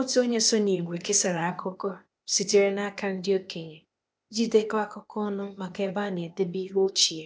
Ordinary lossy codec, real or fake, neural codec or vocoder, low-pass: none; fake; codec, 16 kHz, 0.8 kbps, ZipCodec; none